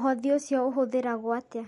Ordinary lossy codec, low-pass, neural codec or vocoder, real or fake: MP3, 48 kbps; 19.8 kHz; none; real